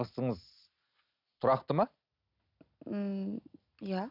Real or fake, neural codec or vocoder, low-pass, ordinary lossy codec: real; none; 5.4 kHz; none